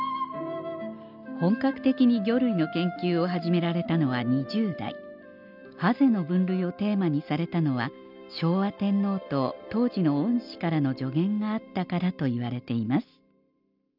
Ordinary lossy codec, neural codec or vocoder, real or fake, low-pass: none; none; real; 5.4 kHz